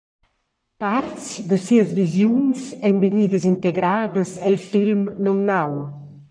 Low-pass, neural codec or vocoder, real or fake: 9.9 kHz; codec, 44.1 kHz, 1.7 kbps, Pupu-Codec; fake